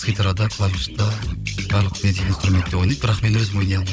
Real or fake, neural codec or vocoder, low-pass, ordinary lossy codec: fake; codec, 16 kHz, 16 kbps, FunCodec, trained on Chinese and English, 50 frames a second; none; none